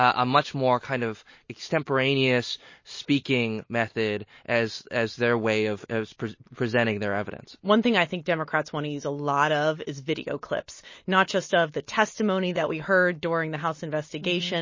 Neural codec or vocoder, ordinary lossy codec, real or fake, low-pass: none; MP3, 32 kbps; real; 7.2 kHz